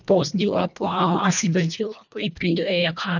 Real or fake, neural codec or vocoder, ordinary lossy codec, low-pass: fake; codec, 24 kHz, 1.5 kbps, HILCodec; none; 7.2 kHz